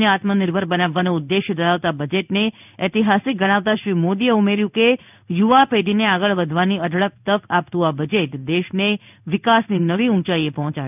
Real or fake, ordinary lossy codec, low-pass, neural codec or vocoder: fake; none; 3.6 kHz; codec, 16 kHz in and 24 kHz out, 1 kbps, XY-Tokenizer